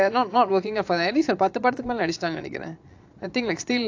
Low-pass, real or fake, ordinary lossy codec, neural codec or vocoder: 7.2 kHz; fake; AAC, 48 kbps; vocoder, 22.05 kHz, 80 mel bands, Vocos